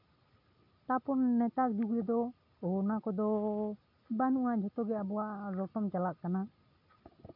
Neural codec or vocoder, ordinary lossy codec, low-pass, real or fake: none; MP3, 48 kbps; 5.4 kHz; real